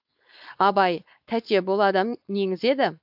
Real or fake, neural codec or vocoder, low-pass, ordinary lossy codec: fake; codec, 16 kHz, 4.8 kbps, FACodec; 5.4 kHz; AAC, 48 kbps